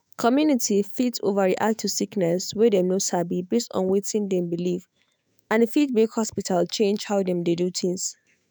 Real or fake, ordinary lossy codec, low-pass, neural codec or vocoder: fake; none; none; autoencoder, 48 kHz, 128 numbers a frame, DAC-VAE, trained on Japanese speech